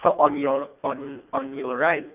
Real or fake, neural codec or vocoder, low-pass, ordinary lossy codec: fake; codec, 24 kHz, 1.5 kbps, HILCodec; 3.6 kHz; none